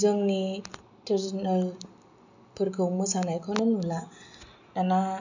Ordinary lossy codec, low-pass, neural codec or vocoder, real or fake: none; 7.2 kHz; none; real